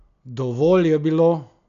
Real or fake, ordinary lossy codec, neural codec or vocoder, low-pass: real; none; none; 7.2 kHz